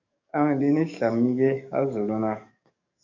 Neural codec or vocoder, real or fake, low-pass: codec, 44.1 kHz, 7.8 kbps, DAC; fake; 7.2 kHz